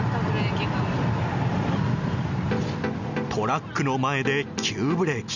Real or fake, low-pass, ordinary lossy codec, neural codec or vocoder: real; 7.2 kHz; none; none